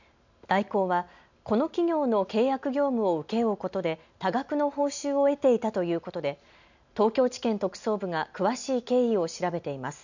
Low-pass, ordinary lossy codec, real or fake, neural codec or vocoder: 7.2 kHz; none; real; none